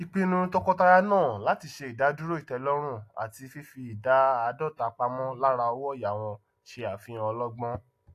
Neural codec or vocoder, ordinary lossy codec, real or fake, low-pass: none; AAC, 64 kbps; real; 14.4 kHz